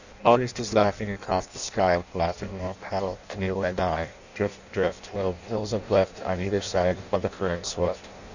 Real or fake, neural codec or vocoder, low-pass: fake; codec, 16 kHz in and 24 kHz out, 0.6 kbps, FireRedTTS-2 codec; 7.2 kHz